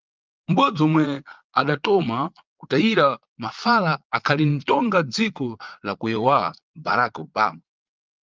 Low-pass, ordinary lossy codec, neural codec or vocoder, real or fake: 7.2 kHz; Opus, 24 kbps; vocoder, 22.05 kHz, 80 mel bands, Vocos; fake